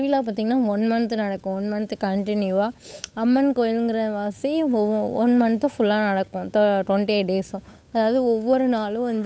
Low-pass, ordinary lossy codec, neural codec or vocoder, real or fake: none; none; codec, 16 kHz, 8 kbps, FunCodec, trained on Chinese and English, 25 frames a second; fake